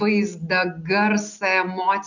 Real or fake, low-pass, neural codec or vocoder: real; 7.2 kHz; none